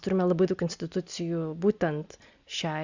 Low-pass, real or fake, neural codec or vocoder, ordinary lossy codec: 7.2 kHz; real; none; Opus, 64 kbps